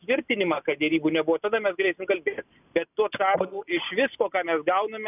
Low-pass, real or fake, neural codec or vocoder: 3.6 kHz; real; none